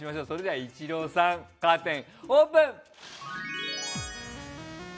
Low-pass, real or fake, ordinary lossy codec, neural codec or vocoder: none; real; none; none